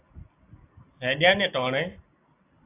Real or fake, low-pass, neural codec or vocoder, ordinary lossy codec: real; 3.6 kHz; none; AAC, 24 kbps